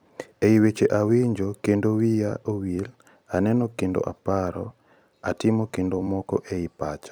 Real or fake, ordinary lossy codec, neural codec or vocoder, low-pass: fake; none; vocoder, 44.1 kHz, 128 mel bands every 256 samples, BigVGAN v2; none